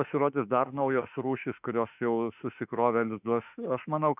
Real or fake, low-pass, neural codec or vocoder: fake; 3.6 kHz; autoencoder, 48 kHz, 32 numbers a frame, DAC-VAE, trained on Japanese speech